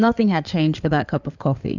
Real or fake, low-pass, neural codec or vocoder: fake; 7.2 kHz; codec, 16 kHz in and 24 kHz out, 2.2 kbps, FireRedTTS-2 codec